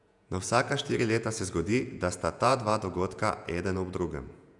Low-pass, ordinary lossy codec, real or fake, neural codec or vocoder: 10.8 kHz; none; fake; vocoder, 48 kHz, 128 mel bands, Vocos